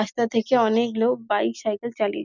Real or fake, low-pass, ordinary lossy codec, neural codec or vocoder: real; 7.2 kHz; none; none